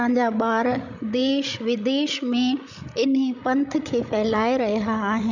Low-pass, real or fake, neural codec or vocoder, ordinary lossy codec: 7.2 kHz; fake; codec, 16 kHz, 16 kbps, FreqCodec, larger model; none